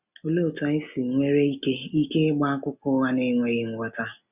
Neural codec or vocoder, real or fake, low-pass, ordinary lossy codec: none; real; 3.6 kHz; none